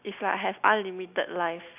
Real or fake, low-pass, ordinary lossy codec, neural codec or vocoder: real; 3.6 kHz; none; none